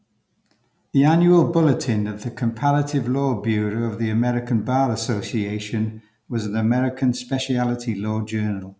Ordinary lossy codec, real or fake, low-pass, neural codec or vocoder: none; real; none; none